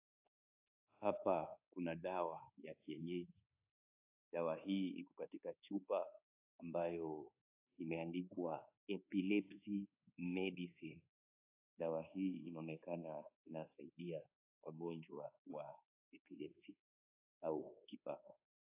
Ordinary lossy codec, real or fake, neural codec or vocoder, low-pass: AAC, 32 kbps; fake; codec, 24 kHz, 1.2 kbps, DualCodec; 3.6 kHz